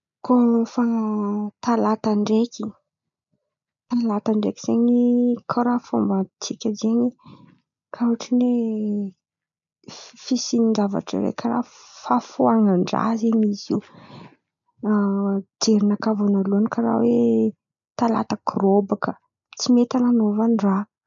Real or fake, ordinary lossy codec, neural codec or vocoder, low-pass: real; none; none; 7.2 kHz